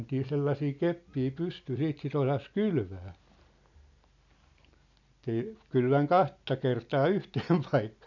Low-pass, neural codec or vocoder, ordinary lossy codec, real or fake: 7.2 kHz; none; none; real